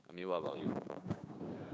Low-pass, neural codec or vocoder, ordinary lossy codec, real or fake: none; codec, 16 kHz, 6 kbps, DAC; none; fake